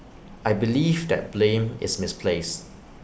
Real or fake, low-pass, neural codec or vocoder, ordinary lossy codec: real; none; none; none